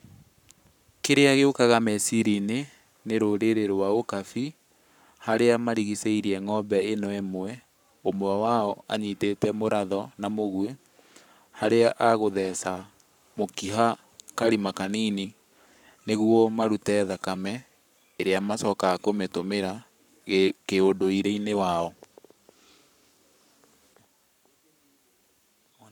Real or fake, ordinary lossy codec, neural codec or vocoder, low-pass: fake; none; codec, 44.1 kHz, 7.8 kbps, Pupu-Codec; 19.8 kHz